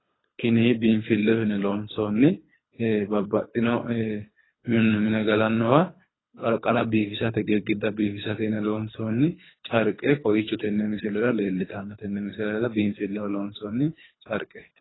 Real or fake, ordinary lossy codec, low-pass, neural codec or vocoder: fake; AAC, 16 kbps; 7.2 kHz; codec, 24 kHz, 3 kbps, HILCodec